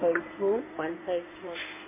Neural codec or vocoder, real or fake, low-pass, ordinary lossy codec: codec, 16 kHz in and 24 kHz out, 2.2 kbps, FireRedTTS-2 codec; fake; 3.6 kHz; MP3, 24 kbps